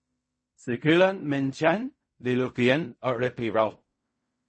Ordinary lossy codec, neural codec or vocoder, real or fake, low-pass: MP3, 32 kbps; codec, 16 kHz in and 24 kHz out, 0.4 kbps, LongCat-Audio-Codec, fine tuned four codebook decoder; fake; 9.9 kHz